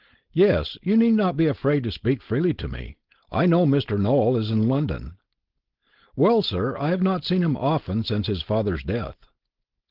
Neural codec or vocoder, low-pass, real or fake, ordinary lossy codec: codec, 16 kHz, 4.8 kbps, FACodec; 5.4 kHz; fake; Opus, 16 kbps